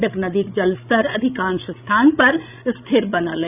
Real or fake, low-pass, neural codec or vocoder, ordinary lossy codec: fake; 3.6 kHz; codec, 16 kHz, 16 kbps, FreqCodec, larger model; none